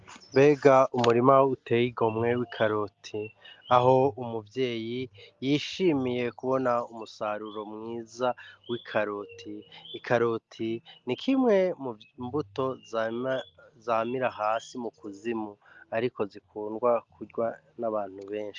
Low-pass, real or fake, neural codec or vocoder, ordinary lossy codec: 7.2 kHz; real; none; Opus, 32 kbps